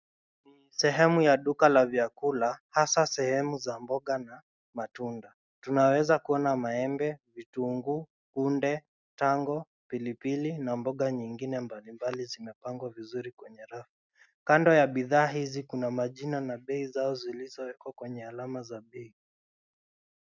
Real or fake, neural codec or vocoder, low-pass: real; none; 7.2 kHz